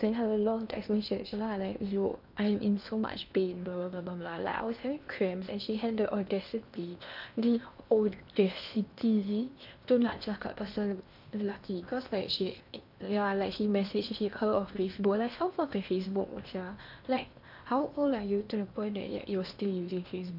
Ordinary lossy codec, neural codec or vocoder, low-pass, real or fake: none; codec, 16 kHz in and 24 kHz out, 0.8 kbps, FocalCodec, streaming, 65536 codes; 5.4 kHz; fake